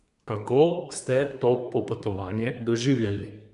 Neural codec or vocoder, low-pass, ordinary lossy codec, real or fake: codec, 24 kHz, 1 kbps, SNAC; 10.8 kHz; none; fake